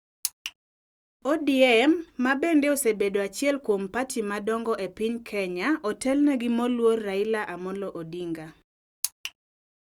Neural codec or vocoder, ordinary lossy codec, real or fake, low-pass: none; Opus, 64 kbps; real; 19.8 kHz